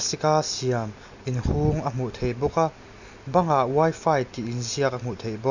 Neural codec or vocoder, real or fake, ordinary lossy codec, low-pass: none; real; none; 7.2 kHz